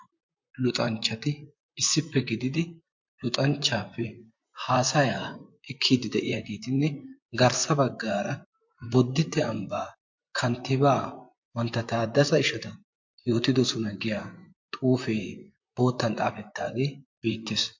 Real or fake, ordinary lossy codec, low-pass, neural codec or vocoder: fake; MP3, 48 kbps; 7.2 kHz; vocoder, 44.1 kHz, 128 mel bands, Pupu-Vocoder